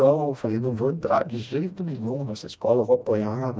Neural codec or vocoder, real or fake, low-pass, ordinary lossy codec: codec, 16 kHz, 1 kbps, FreqCodec, smaller model; fake; none; none